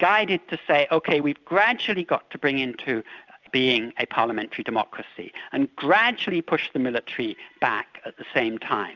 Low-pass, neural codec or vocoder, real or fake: 7.2 kHz; none; real